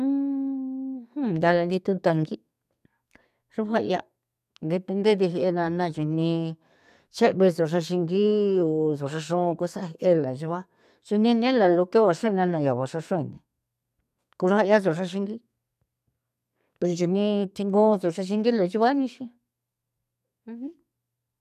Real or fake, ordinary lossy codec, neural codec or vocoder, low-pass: fake; none; codec, 32 kHz, 1.9 kbps, SNAC; 14.4 kHz